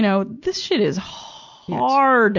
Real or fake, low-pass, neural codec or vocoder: real; 7.2 kHz; none